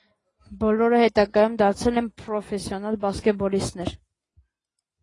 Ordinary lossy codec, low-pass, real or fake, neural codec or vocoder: AAC, 32 kbps; 10.8 kHz; real; none